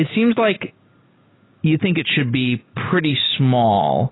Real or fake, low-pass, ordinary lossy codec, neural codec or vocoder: real; 7.2 kHz; AAC, 16 kbps; none